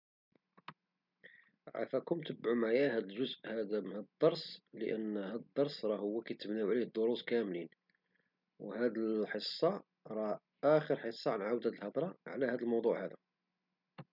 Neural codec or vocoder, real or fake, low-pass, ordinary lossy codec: none; real; 5.4 kHz; none